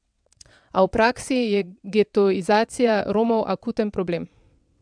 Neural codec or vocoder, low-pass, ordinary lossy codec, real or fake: vocoder, 22.05 kHz, 80 mel bands, WaveNeXt; 9.9 kHz; MP3, 96 kbps; fake